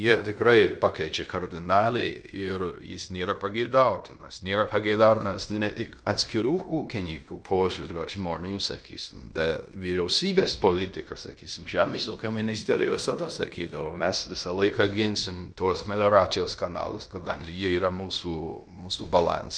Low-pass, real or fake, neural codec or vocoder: 9.9 kHz; fake; codec, 16 kHz in and 24 kHz out, 0.9 kbps, LongCat-Audio-Codec, fine tuned four codebook decoder